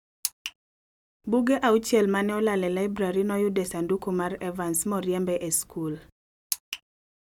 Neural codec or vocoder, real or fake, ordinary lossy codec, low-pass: none; real; none; 19.8 kHz